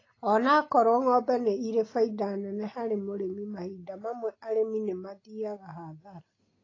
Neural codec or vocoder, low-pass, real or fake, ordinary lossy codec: none; 7.2 kHz; real; AAC, 32 kbps